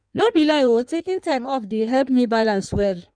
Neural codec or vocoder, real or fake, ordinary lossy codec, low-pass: codec, 16 kHz in and 24 kHz out, 1.1 kbps, FireRedTTS-2 codec; fake; none; 9.9 kHz